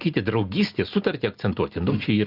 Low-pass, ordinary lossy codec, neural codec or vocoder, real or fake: 5.4 kHz; Opus, 24 kbps; none; real